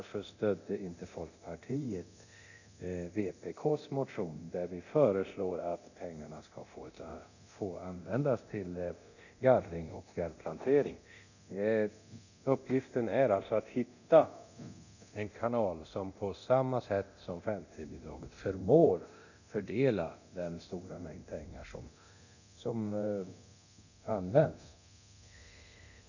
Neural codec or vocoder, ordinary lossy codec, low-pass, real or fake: codec, 24 kHz, 0.9 kbps, DualCodec; none; 7.2 kHz; fake